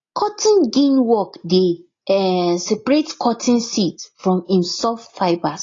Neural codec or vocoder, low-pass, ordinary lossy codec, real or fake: none; 7.2 kHz; AAC, 32 kbps; real